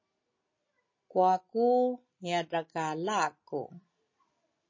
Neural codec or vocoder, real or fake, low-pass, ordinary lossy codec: none; real; 7.2 kHz; MP3, 32 kbps